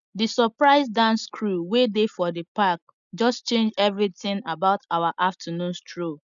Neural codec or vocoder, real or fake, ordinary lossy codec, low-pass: none; real; none; 7.2 kHz